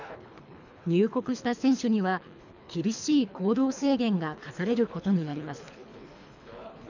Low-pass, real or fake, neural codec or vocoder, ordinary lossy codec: 7.2 kHz; fake; codec, 24 kHz, 3 kbps, HILCodec; none